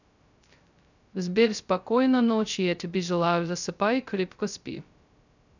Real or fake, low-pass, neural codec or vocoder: fake; 7.2 kHz; codec, 16 kHz, 0.2 kbps, FocalCodec